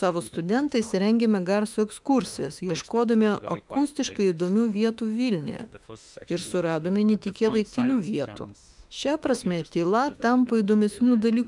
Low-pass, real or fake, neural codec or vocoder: 10.8 kHz; fake; autoencoder, 48 kHz, 32 numbers a frame, DAC-VAE, trained on Japanese speech